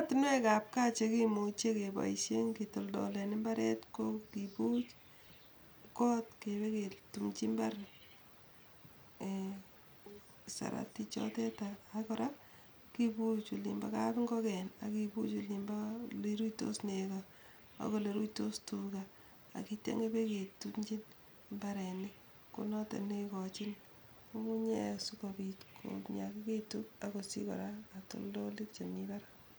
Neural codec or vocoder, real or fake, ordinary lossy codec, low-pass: none; real; none; none